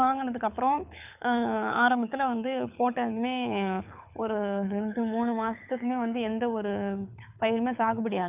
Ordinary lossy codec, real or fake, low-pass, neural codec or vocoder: none; fake; 3.6 kHz; codec, 24 kHz, 6 kbps, HILCodec